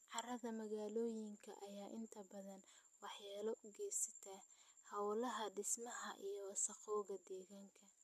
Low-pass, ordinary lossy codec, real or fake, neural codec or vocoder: 14.4 kHz; MP3, 96 kbps; real; none